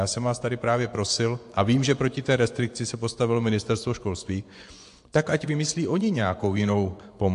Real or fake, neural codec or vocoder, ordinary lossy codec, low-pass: fake; vocoder, 24 kHz, 100 mel bands, Vocos; AAC, 64 kbps; 10.8 kHz